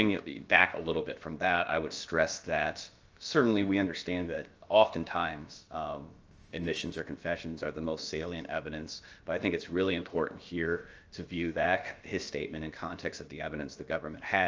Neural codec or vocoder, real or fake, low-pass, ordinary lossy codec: codec, 16 kHz, about 1 kbps, DyCAST, with the encoder's durations; fake; 7.2 kHz; Opus, 24 kbps